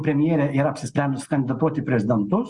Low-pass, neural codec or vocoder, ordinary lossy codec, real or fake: 10.8 kHz; none; Opus, 64 kbps; real